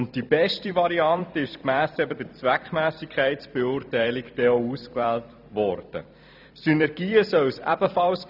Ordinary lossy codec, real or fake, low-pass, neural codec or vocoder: none; fake; 5.4 kHz; vocoder, 44.1 kHz, 128 mel bands every 256 samples, BigVGAN v2